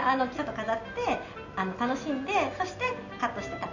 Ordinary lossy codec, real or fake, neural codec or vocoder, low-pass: none; real; none; 7.2 kHz